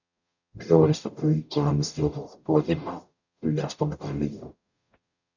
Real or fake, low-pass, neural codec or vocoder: fake; 7.2 kHz; codec, 44.1 kHz, 0.9 kbps, DAC